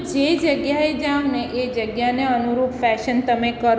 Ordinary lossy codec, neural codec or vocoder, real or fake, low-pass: none; none; real; none